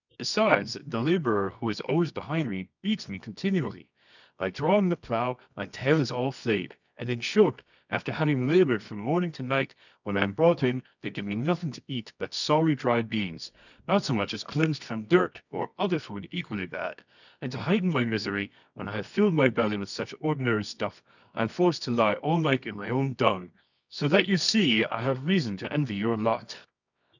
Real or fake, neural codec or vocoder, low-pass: fake; codec, 24 kHz, 0.9 kbps, WavTokenizer, medium music audio release; 7.2 kHz